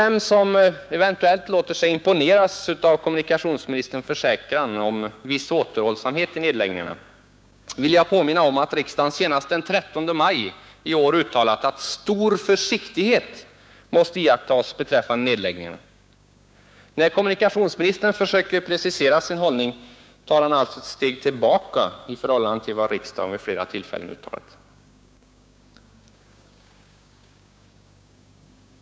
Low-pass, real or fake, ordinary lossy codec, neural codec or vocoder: none; fake; none; codec, 16 kHz, 6 kbps, DAC